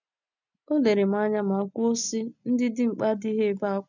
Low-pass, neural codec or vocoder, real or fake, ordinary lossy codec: 7.2 kHz; none; real; none